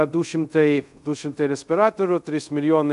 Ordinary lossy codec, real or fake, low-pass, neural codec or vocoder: MP3, 96 kbps; fake; 10.8 kHz; codec, 24 kHz, 0.5 kbps, DualCodec